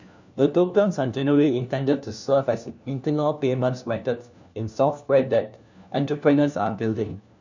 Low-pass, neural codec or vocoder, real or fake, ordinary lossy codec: 7.2 kHz; codec, 16 kHz, 1 kbps, FunCodec, trained on LibriTTS, 50 frames a second; fake; none